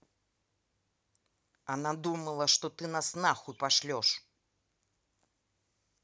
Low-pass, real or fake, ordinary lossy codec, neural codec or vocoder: none; real; none; none